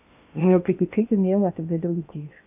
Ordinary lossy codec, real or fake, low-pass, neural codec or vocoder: AAC, 32 kbps; fake; 3.6 kHz; codec, 16 kHz in and 24 kHz out, 0.8 kbps, FocalCodec, streaming, 65536 codes